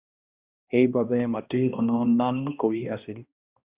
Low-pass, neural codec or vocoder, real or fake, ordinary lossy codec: 3.6 kHz; codec, 16 kHz, 1 kbps, X-Codec, HuBERT features, trained on balanced general audio; fake; Opus, 64 kbps